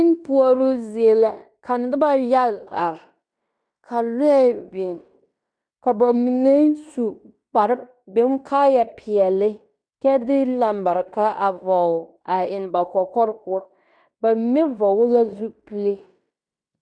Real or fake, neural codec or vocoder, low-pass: fake; codec, 16 kHz in and 24 kHz out, 0.9 kbps, LongCat-Audio-Codec, fine tuned four codebook decoder; 9.9 kHz